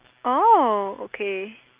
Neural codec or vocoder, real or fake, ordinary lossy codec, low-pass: none; real; Opus, 32 kbps; 3.6 kHz